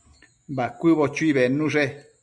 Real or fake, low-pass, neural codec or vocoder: real; 10.8 kHz; none